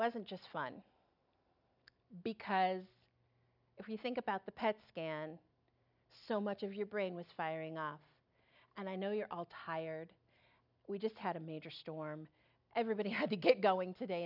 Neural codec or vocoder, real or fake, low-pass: none; real; 5.4 kHz